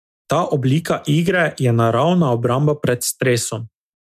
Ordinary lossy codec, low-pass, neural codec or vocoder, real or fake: MP3, 96 kbps; 14.4 kHz; none; real